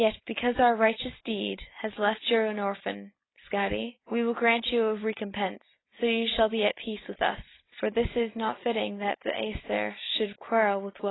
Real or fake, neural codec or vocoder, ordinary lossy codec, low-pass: real; none; AAC, 16 kbps; 7.2 kHz